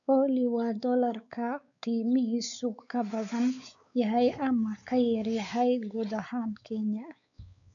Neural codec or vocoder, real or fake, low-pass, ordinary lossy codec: codec, 16 kHz, 4 kbps, X-Codec, WavLM features, trained on Multilingual LibriSpeech; fake; 7.2 kHz; none